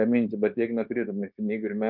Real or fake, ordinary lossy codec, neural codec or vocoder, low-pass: fake; Opus, 24 kbps; codec, 16 kHz, 0.9 kbps, LongCat-Audio-Codec; 5.4 kHz